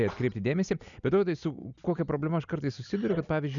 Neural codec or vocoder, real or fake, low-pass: none; real; 7.2 kHz